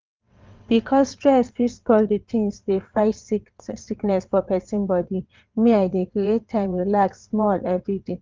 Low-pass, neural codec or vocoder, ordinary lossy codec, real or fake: 7.2 kHz; vocoder, 22.05 kHz, 80 mel bands, WaveNeXt; Opus, 24 kbps; fake